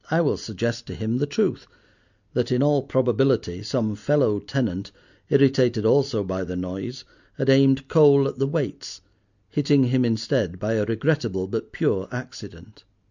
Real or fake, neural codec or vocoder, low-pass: real; none; 7.2 kHz